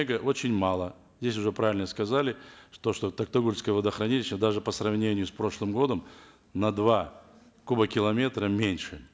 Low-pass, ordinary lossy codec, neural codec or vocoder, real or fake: none; none; none; real